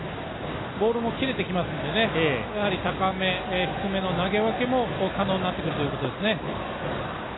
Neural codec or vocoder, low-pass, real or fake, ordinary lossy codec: none; 7.2 kHz; real; AAC, 16 kbps